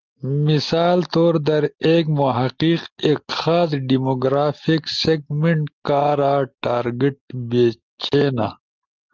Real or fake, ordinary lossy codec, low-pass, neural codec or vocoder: real; Opus, 32 kbps; 7.2 kHz; none